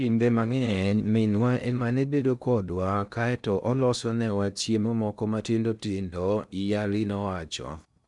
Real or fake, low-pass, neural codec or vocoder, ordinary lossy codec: fake; 10.8 kHz; codec, 16 kHz in and 24 kHz out, 0.6 kbps, FocalCodec, streaming, 2048 codes; none